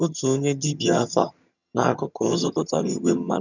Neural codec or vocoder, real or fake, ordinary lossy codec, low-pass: vocoder, 22.05 kHz, 80 mel bands, HiFi-GAN; fake; none; 7.2 kHz